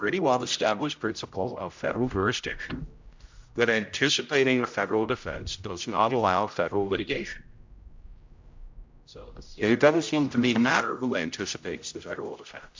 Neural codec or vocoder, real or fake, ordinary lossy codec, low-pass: codec, 16 kHz, 0.5 kbps, X-Codec, HuBERT features, trained on general audio; fake; MP3, 64 kbps; 7.2 kHz